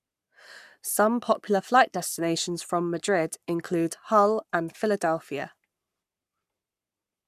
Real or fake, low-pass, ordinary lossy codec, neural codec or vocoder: fake; 14.4 kHz; none; codec, 44.1 kHz, 7.8 kbps, Pupu-Codec